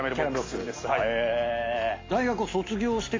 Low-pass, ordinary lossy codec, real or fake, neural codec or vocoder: 7.2 kHz; AAC, 32 kbps; real; none